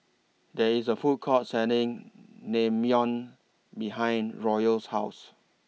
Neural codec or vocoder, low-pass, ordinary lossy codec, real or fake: none; none; none; real